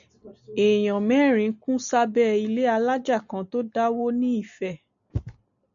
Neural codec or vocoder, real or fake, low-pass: none; real; 7.2 kHz